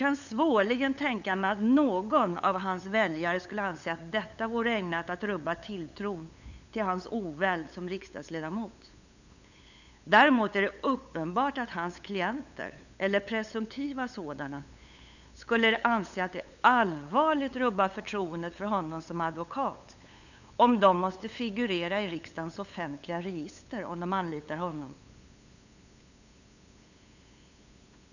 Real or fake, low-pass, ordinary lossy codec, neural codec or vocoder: fake; 7.2 kHz; none; codec, 16 kHz, 8 kbps, FunCodec, trained on LibriTTS, 25 frames a second